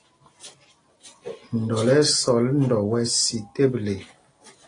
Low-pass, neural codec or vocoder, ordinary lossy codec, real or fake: 9.9 kHz; none; AAC, 32 kbps; real